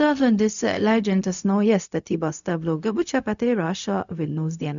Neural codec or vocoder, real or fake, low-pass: codec, 16 kHz, 0.4 kbps, LongCat-Audio-Codec; fake; 7.2 kHz